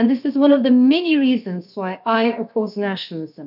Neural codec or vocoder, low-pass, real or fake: codec, 16 kHz, about 1 kbps, DyCAST, with the encoder's durations; 5.4 kHz; fake